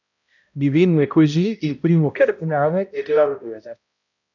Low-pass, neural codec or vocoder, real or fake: 7.2 kHz; codec, 16 kHz, 0.5 kbps, X-Codec, HuBERT features, trained on balanced general audio; fake